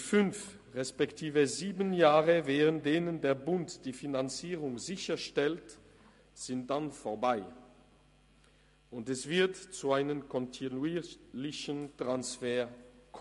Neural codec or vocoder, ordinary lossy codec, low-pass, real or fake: none; MP3, 48 kbps; 14.4 kHz; real